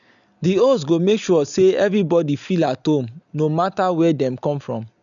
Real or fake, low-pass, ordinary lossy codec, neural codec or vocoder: real; 7.2 kHz; none; none